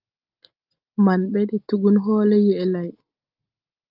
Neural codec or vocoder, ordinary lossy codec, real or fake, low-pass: none; Opus, 24 kbps; real; 5.4 kHz